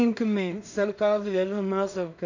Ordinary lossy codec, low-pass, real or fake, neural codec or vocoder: none; 7.2 kHz; fake; codec, 16 kHz in and 24 kHz out, 0.4 kbps, LongCat-Audio-Codec, two codebook decoder